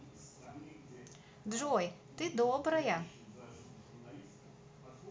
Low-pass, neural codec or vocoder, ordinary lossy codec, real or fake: none; none; none; real